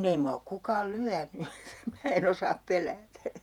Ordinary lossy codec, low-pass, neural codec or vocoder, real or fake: none; 19.8 kHz; vocoder, 48 kHz, 128 mel bands, Vocos; fake